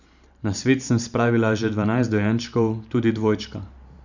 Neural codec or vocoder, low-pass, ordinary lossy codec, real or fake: vocoder, 44.1 kHz, 128 mel bands every 512 samples, BigVGAN v2; 7.2 kHz; none; fake